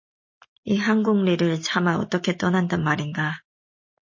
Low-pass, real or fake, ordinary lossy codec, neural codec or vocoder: 7.2 kHz; real; MP3, 32 kbps; none